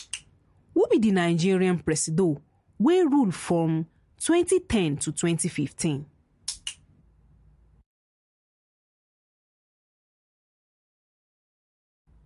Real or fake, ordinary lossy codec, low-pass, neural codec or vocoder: real; MP3, 48 kbps; 14.4 kHz; none